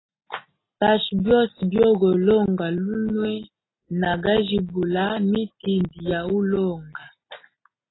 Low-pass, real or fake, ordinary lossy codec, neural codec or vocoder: 7.2 kHz; real; AAC, 16 kbps; none